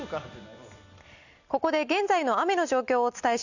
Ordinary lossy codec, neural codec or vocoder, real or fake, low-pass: none; none; real; 7.2 kHz